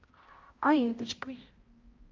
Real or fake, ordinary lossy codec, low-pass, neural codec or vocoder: fake; Opus, 32 kbps; 7.2 kHz; codec, 16 kHz, 0.5 kbps, X-Codec, HuBERT features, trained on balanced general audio